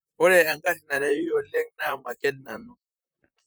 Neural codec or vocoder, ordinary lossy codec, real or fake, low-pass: vocoder, 44.1 kHz, 128 mel bands, Pupu-Vocoder; none; fake; none